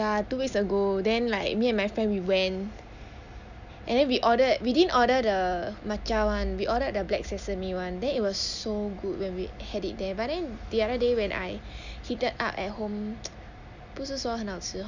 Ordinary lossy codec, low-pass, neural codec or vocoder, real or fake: none; 7.2 kHz; none; real